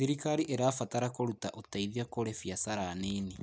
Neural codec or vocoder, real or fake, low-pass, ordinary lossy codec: none; real; none; none